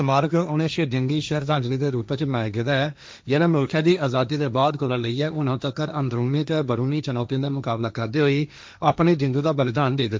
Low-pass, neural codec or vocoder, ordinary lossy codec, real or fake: none; codec, 16 kHz, 1.1 kbps, Voila-Tokenizer; none; fake